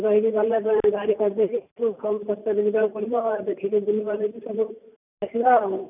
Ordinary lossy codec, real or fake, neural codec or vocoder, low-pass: none; fake; vocoder, 44.1 kHz, 128 mel bands every 512 samples, BigVGAN v2; 3.6 kHz